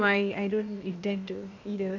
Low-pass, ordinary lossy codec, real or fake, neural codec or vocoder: 7.2 kHz; AAC, 48 kbps; fake; codec, 16 kHz, 0.8 kbps, ZipCodec